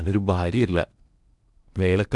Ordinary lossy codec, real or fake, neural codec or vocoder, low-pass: none; fake; codec, 16 kHz in and 24 kHz out, 0.8 kbps, FocalCodec, streaming, 65536 codes; 10.8 kHz